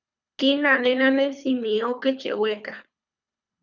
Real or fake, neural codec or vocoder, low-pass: fake; codec, 24 kHz, 3 kbps, HILCodec; 7.2 kHz